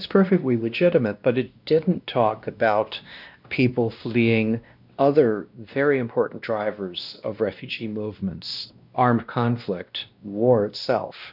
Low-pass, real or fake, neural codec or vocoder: 5.4 kHz; fake; codec, 16 kHz, 1 kbps, X-Codec, WavLM features, trained on Multilingual LibriSpeech